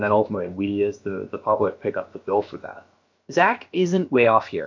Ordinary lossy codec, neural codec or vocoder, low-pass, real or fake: MP3, 48 kbps; codec, 16 kHz, about 1 kbps, DyCAST, with the encoder's durations; 7.2 kHz; fake